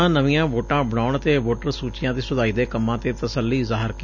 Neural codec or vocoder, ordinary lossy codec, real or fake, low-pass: none; none; real; 7.2 kHz